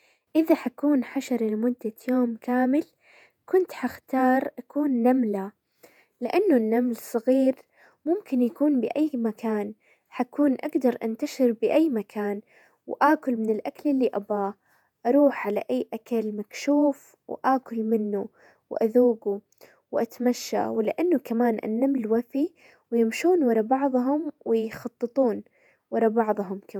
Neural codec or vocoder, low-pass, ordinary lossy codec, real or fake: vocoder, 48 kHz, 128 mel bands, Vocos; 19.8 kHz; none; fake